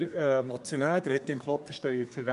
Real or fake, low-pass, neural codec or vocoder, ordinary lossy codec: fake; 10.8 kHz; codec, 24 kHz, 1 kbps, SNAC; none